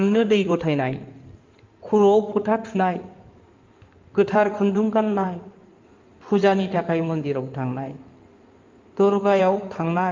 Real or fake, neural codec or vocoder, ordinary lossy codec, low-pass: fake; codec, 16 kHz in and 24 kHz out, 2.2 kbps, FireRedTTS-2 codec; Opus, 32 kbps; 7.2 kHz